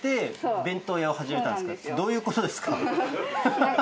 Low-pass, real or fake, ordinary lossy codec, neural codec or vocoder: none; real; none; none